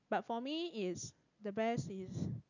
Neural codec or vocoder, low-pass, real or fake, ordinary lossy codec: none; 7.2 kHz; real; none